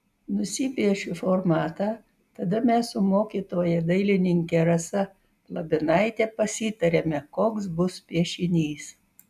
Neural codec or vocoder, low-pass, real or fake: none; 14.4 kHz; real